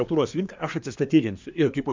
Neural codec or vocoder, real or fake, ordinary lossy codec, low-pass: codec, 24 kHz, 1 kbps, SNAC; fake; MP3, 64 kbps; 7.2 kHz